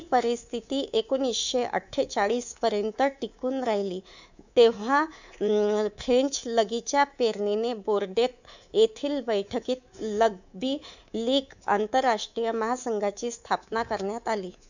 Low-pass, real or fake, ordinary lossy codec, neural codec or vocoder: 7.2 kHz; fake; none; codec, 24 kHz, 3.1 kbps, DualCodec